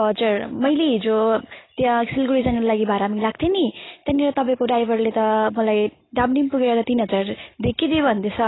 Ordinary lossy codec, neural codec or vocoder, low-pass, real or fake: AAC, 16 kbps; none; 7.2 kHz; real